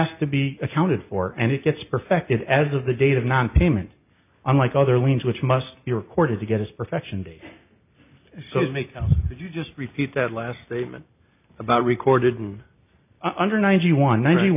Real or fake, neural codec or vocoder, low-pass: real; none; 3.6 kHz